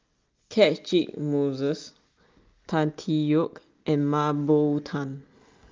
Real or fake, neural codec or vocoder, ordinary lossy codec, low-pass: real; none; Opus, 24 kbps; 7.2 kHz